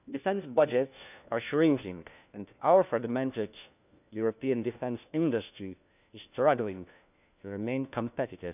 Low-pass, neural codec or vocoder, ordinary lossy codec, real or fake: 3.6 kHz; codec, 16 kHz, 1 kbps, FunCodec, trained on LibriTTS, 50 frames a second; none; fake